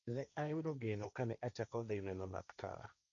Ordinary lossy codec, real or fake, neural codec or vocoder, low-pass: MP3, 96 kbps; fake; codec, 16 kHz, 1.1 kbps, Voila-Tokenizer; 7.2 kHz